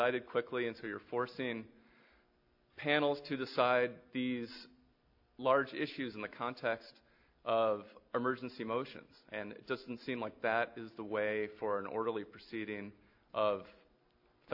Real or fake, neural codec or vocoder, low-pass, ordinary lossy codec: real; none; 5.4 kHz; AAC, 48 kbps